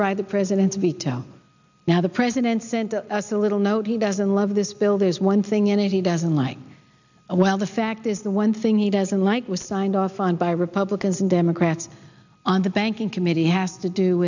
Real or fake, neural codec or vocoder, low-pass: real; none; 7.2 kHz